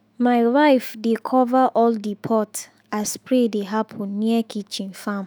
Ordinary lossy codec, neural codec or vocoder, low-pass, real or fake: none; autoencoder, 48 kHz, 128 numbers a frame, DAC-VAE, trained on Japanese speech; none; fake